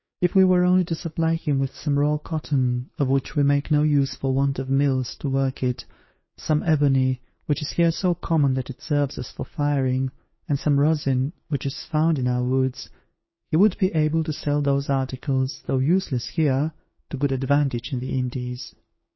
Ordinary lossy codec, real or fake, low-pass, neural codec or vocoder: MP3, 24 kbps; fake; 7.2 kHz; codec, 16 kHz, 6 kbps, DAC